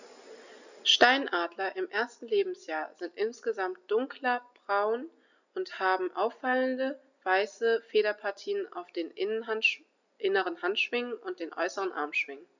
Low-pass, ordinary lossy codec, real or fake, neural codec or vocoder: 7.2 kHz; none; real; none